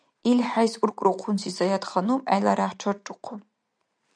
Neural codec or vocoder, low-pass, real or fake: none; 9.9 kHz; real